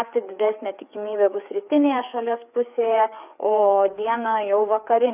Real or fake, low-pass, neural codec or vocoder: fake; 3.6 kHz; codec, 16 kHz, 4 kbps, FreqCodec, larger model